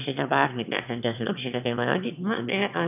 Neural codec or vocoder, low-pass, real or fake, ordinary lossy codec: autoencoder, 22.05 kHz, a latent of 192 numbers a frame, VITS, trained on one speaker; 3.6 kHz; fake; none